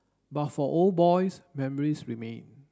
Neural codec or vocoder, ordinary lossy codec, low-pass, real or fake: none; none; none; real